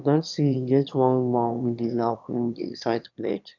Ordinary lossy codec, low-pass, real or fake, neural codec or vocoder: none; 7.2 kHz; fake; autoencoder, 22.05 kHz, a latent of 192 numbers a frame, VITS, trained on one speaker